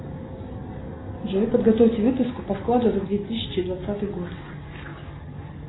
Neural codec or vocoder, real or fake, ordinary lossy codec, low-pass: none; real; AAC, 16 kbps; 7.2 kHz